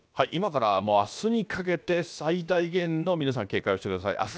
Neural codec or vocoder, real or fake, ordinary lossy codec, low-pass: codec, 16 kHz, about 1 kbps, DyCAST, with the encoder's durations; fake; none; none